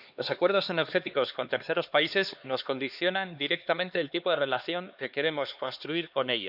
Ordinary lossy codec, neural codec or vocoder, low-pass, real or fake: none; codec, 16 kHz, 2 kbps, X-Codec, HuBERT features, trained on LibriSpeech; 5.4 kHz; fake